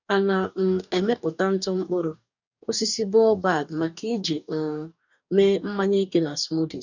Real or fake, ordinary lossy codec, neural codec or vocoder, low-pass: fake; none; codec, 44.1 kHz, 2.6 kbps, DAC; 7.2 kHz